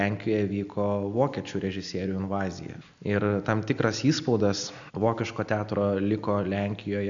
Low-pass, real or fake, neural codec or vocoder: 7.2 kHz; real; none